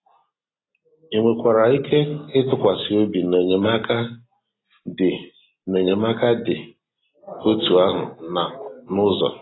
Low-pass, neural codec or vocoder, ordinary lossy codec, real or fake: 7.2 kHz; none; AAC, 16 kbps; real